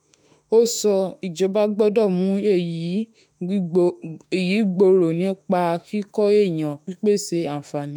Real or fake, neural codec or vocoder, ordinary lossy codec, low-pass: fake; autoencoder, 48 kHz, 32 numbers a frame, DAC-VAE, trained on Japanese speech; none; 19.8 kHz